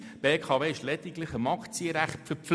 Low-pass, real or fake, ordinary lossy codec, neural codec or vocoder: none; real; none; none